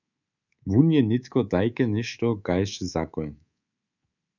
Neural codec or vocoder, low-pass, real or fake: codec, 24 kHz, 3.1 kbps, DualCodec; 7.2 kHz; fake